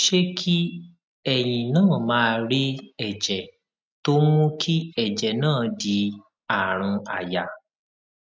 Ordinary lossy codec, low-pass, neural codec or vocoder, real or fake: none; none; none; real